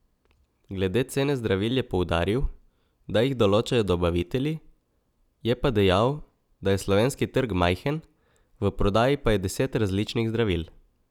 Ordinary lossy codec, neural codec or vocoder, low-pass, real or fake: none; none; 19.8 kHz; real